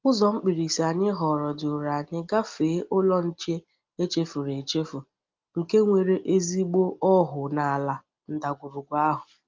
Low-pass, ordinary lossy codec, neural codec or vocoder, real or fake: 7.2 kHz; Opus, 32 kbps; none; real